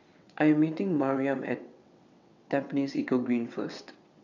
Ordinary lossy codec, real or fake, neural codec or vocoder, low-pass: none; fake; vocoder, 22.05 kHz, 80 mel bands, WaveNeXt; 7.2 kHz